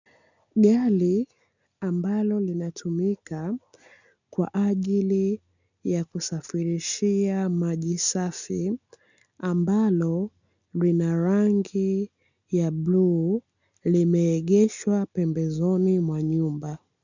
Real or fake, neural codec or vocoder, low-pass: real; none; 7.2 kHz